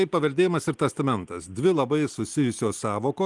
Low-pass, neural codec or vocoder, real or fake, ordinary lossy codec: 10.8 kHz; none; real; Opus, 24 kbps